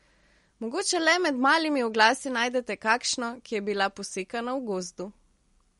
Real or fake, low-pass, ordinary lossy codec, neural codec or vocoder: real; 19.8 kHz; MP3, 48 kbps; none